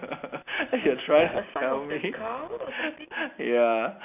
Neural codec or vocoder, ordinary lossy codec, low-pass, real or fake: none; none; 3.6 kHz; real